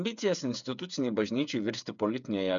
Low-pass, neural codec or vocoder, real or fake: 7.2 kHz; codec, 16 kHz, 8 kbps, FreqCodec, smaller model; fake